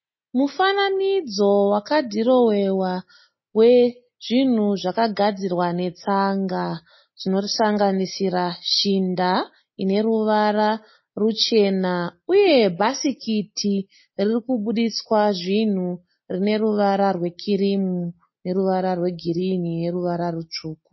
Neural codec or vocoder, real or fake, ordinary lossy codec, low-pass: none; real; MP3, 24 kbps; 7.2 kHz